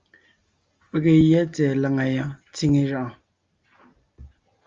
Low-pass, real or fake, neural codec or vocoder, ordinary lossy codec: 7.2 kHz; real; none; Opus, 32 kbps